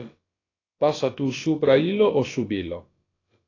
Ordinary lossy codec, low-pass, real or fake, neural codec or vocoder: AAC, 32 kbps; 7.2 kHz; fake; codec, 16 kHz, about 1 kbps, DyCAST, with the encoder's durations